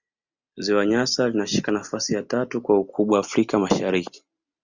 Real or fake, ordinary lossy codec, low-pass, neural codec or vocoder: real; Opus, 64 kbps; 7.2 kHz; none